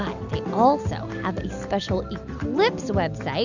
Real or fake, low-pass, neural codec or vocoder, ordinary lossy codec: real; 7.2 kHz; none; Opus, 64 kbps